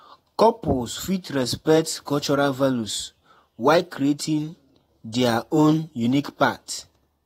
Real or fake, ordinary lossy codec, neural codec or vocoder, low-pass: fake; AAC, 48 kbps; vocoder, 48 kHz, 128 mel bands, Vocos; 19.8 kHz